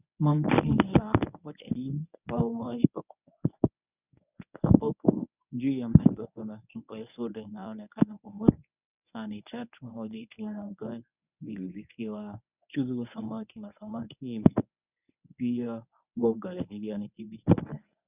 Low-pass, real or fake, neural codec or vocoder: 3.6 kHz; fake; codec, 24 kHz, 0.9 kbps, WavTokenizer, medium speech release version 1